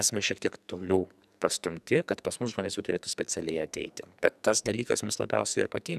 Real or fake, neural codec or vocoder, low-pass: fake; codec, 44.1 kHz, 2.6 kbps, SNAC; 14.4 kHz